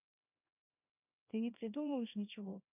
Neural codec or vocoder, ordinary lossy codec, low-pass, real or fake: codec, 24 kHz, 0.9 kbps, WavTokenizer, small release; none; 3.6 kHz; fake